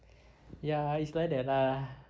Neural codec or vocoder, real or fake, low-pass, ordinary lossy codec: none; real; none; none